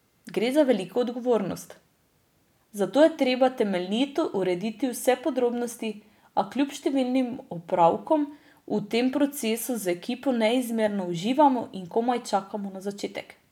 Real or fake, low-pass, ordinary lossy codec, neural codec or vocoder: real; 19.8 kHz; none; none